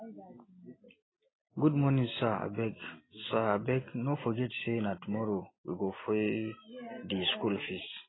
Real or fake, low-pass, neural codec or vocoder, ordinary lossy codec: real; 7.2 kHz; none; AAC, 16 kbps